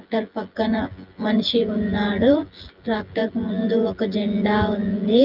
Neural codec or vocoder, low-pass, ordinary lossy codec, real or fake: vocoder, 24 kHz, 100 mel bands, Vocos; 5.4 kHz; Opus, 32 kbps; fake